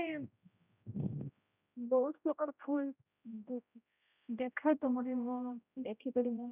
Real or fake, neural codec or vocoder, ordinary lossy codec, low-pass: fake; codec, 16 kHz, 0.5 kbps, X-Codec, HuBERT features, trained on general audio; none; 3.6 kHz